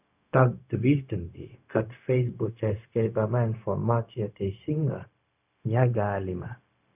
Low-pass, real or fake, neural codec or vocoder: 3.6 kHz; fake; codec, 16 kHz, 0.4 kbps, LongCat-Audio-Codec